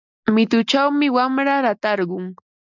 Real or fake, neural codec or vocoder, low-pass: real; none; 7.2 kHz